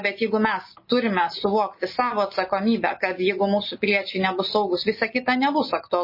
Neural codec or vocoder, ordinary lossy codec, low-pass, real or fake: none; MP3, 24 kbps; 5.4 kHz; real